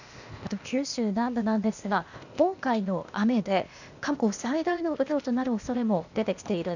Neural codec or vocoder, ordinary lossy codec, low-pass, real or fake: codec, 16 kHz, 0.8 kbps, ZipCodec; none; 7.2 kHz; fake